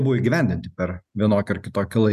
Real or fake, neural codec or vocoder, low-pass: real; none; 14.4 kHz